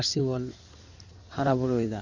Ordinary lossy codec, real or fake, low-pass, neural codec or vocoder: none; fake; 7.2 kHz; codec, 16 kHz in and 24 kHz out, 2.2 kbps, FireRedTTS-2 codec